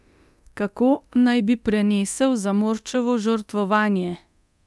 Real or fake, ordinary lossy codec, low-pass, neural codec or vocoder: fake; none; none; codec, 24 kHz, 0.9 kbps, DualCodec